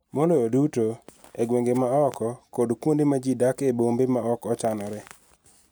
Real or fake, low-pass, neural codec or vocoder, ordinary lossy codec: real; none; none; none